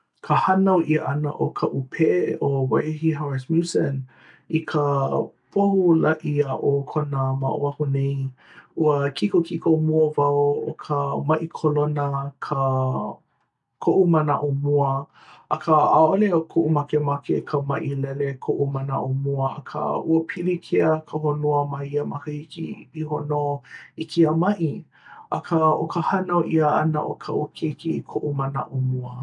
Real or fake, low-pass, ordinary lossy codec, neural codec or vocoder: real; 10.8 kHz; none; none